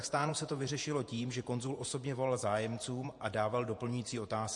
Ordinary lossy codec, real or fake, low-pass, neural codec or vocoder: MP3, 48 kbps; fake; 10.8 kHz; vocoder, 48 kHz, 128 mel bands, Vocos